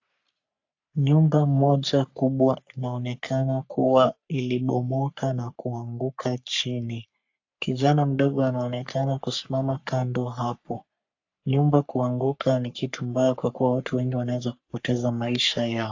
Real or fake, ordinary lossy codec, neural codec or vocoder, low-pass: fake; AAC, 48 kbps; codec, 44.1 kHz, 3.4 kbps, Pupu-Codec; 7.2 kHz